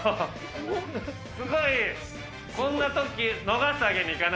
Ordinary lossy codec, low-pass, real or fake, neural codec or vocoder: none; none; real; none